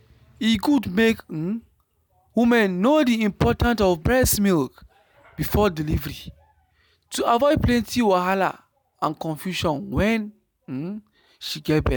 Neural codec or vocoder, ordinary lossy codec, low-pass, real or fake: none; none; none; real